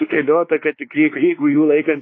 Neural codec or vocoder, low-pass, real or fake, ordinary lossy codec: codec, 16 kHz, 2 kbps, X-Codec, WavLM features, trained on Multilingual LibriSpeech; 7.2 kHz; fake; AAC, 32 kbps